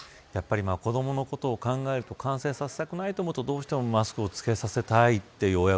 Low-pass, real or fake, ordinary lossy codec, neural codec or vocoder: none; real; none; none